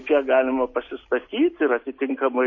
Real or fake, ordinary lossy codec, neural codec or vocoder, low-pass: real; MP3, 32 kbps; none; 7.2 kHz